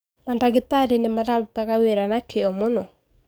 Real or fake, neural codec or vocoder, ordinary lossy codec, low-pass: fake; codec, 44.1 kHz, 7.8 kbps, DAC; none; none